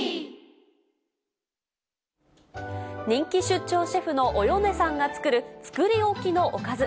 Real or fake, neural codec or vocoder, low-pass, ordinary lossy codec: real; none; none; none